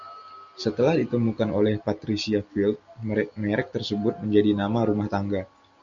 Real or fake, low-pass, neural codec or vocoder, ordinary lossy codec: real; 7.2 kHz; none; Opus, 64 kbps